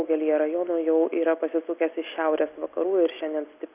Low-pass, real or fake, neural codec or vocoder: 3.6 kHz; real; none